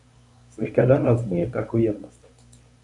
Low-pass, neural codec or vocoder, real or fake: 10.8 kHz; codec, 24 kHz, 0.9 kbps, WavTokenizer, medium speech release version 1; fake